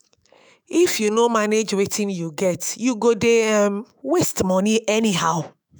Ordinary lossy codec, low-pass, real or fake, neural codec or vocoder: none; none; fake; autoencoder, 48 kHz, 128 numbers a frame, DAC-VAE, trained on Japanese speech